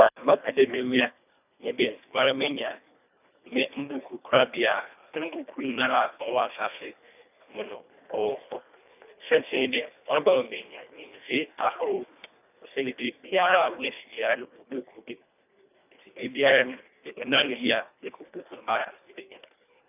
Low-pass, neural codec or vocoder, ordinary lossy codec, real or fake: 3.6 kHz; codec, 24 kHz, 1.5 kbps, HILCodec; none; fake